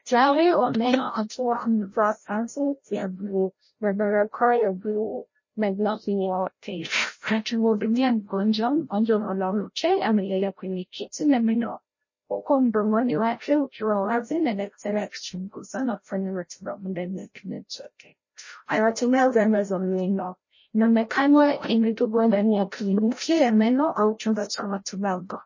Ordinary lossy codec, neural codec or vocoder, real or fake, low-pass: MP3, 32 kbps; codec, 16 kHz, 0.5 kbps, FreqCodec, larger model; fake; 7.2 kHz